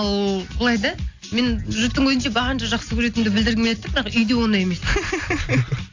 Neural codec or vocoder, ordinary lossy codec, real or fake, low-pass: none; AAC, 48 kbps; real; 7.2 kHz